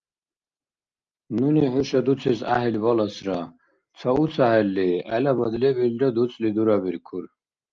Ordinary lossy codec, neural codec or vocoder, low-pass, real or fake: Opus, 24 kbps; none; 7.2 kHz; real